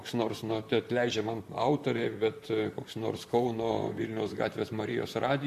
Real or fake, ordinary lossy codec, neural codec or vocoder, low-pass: fake; MP3, 64 kbps; vocoder, 44.1 kHz, 128 mel bands, Pupu-Vocoder; 14.4 kHz